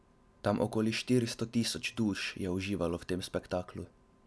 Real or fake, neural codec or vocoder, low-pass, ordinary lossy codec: real; none; none; none